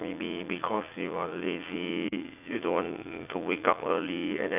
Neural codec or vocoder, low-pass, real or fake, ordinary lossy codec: vocoder, 44.1 kHz, 80 mel bands, Vocos; 3.6 kHz; fake; none